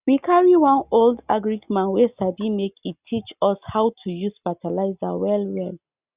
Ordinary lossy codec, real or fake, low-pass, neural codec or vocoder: Opus, 64 kbps; real; 3.6 kHz; none